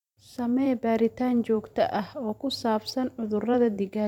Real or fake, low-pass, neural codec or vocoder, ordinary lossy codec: fake; 19.8 kHz; vocoder, 44.1 kHz, 128 mel bands every 512 samples, BigVGAN v2; none